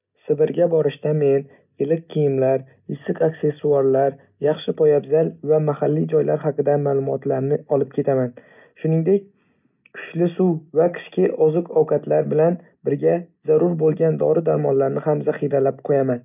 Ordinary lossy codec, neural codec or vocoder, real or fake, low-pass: none; none; real; 3.6 kHz